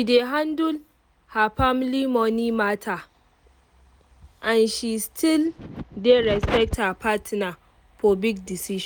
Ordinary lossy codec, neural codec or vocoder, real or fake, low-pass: none; none; real; none